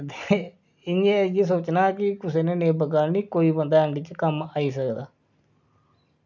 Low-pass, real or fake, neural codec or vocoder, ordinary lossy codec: 7.2 kHz; real; none; none